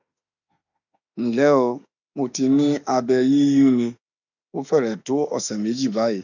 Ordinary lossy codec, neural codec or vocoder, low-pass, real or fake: none; autoencoder, 48 kHz, 32 numbers a frame, DAC-VAE, trained on Japanese speech; 7.2 kHz; fake